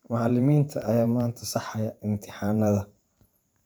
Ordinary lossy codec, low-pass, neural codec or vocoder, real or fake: none; none; vocoder, 44.1 kHz, 128 mel bands every 512 samples, BigVGAN v2; fake